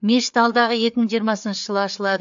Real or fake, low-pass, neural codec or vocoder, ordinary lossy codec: fake; 7.2 kHz; codec, 16 kHz, 4 kbps, FreqCodec, larger model; AAC, 64 kbps